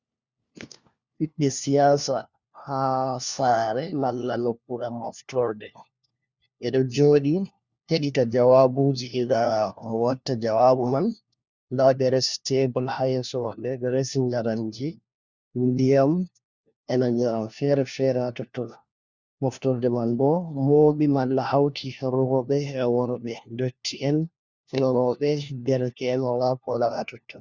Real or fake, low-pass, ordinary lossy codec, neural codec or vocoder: fake; 7.2 kHz; Opus, 64 kbps; codec, 16 kHz, 1 kbps, FunCodec, trained on LibriTTS, 50 frames a second